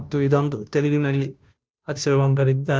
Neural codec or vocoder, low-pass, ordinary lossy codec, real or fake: codec, 16 kHz, 0.5 kbps, FunCodec, trained on Chinese and English, 25 frames a second; none; none; fake